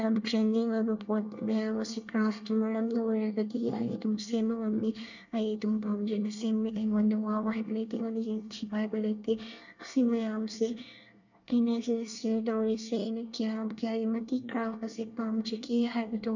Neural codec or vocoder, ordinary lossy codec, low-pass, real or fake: codec, 24 kHz, 1 kbps, SNAC; none; 7.2 kHz; fake